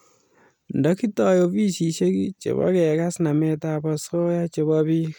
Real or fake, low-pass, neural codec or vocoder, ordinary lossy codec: real; none; none; none